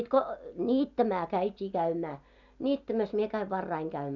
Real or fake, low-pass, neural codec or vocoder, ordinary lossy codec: real; 7.2 kHz; none; none